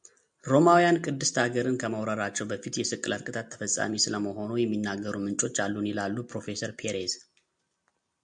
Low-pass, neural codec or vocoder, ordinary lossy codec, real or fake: 9.9 kHz; none; MP3, 64 kbps; real